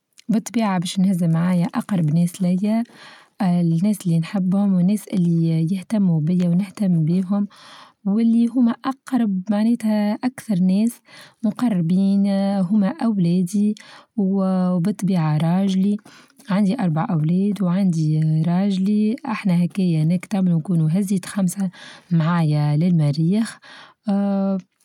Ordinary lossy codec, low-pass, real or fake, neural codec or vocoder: none; 19.8 kHz; real; none